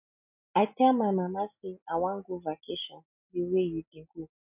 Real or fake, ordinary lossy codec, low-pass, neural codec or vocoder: real; none; 3.6 kHz; none